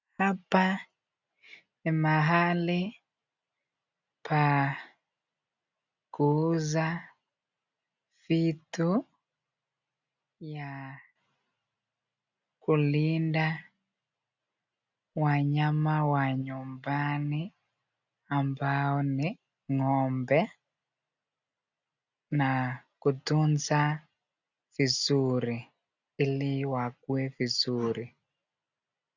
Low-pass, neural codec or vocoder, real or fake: 7.2 kHz; none; real